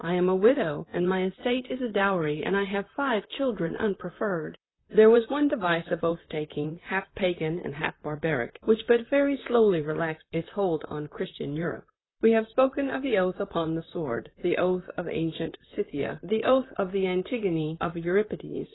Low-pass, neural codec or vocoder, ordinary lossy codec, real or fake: 7.2 kHz; vocoder, 44.1 kHz, 128 mel bands, Pupu-Vocoder; AAC, 16 kbps; fake